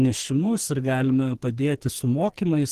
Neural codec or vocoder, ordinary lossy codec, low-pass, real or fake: codec, 44.1 kHz, 2.6 kbps, SNAC; Opus, 16 kbps; 14.4 kHz; fake